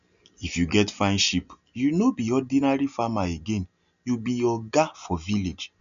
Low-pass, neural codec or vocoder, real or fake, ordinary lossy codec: 7.2 kHz; none; real; none